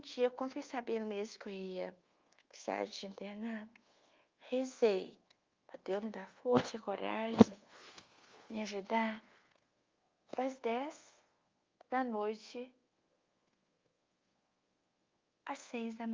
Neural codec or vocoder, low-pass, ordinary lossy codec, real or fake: codec, 24 kHz, 1.2 kbps, DualCodec; 7.2 kHz; Opus, 32 kbps; fake